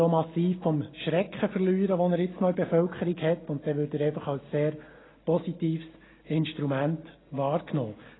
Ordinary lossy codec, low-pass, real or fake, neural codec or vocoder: AAC, 16 kbps; 7.2 kHz; real; none